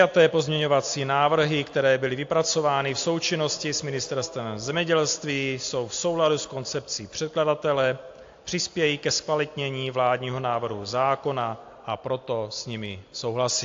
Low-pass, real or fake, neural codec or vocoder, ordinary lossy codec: 7.2 kHz; real; none; AAC, 48 kbps